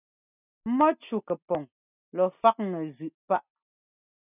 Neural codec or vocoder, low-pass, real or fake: none; 3.6 kHz; real